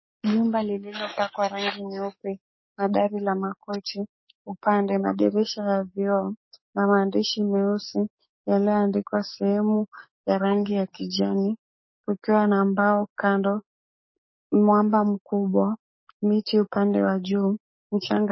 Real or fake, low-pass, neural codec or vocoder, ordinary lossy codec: fake; 7.2 kHz; codec, 44.1 kHz, 7.8 kbps, DAC; MP3, 24 kbps